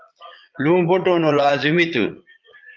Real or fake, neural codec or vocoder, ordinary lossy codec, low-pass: fake; vocoder, 22.05 kHz, 80 mel bands, Vocos; Opus, 32 kbps; 7.2 kHz